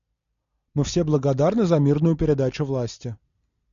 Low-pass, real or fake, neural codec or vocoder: 7.2 kHz; real; none